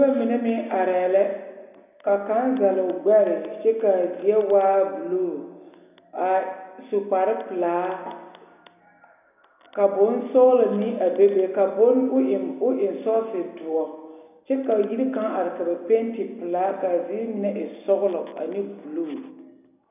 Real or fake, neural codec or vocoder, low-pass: real; none; 3.6 kHz